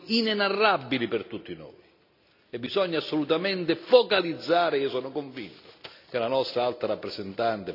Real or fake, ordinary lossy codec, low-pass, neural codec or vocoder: real; none; 5.4 kHz; none